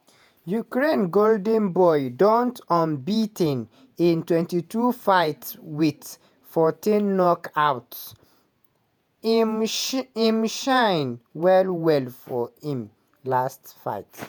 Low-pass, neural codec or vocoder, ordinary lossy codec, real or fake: none; vocoder, 48 kHz, 128 mel bands, Vocos; none; fake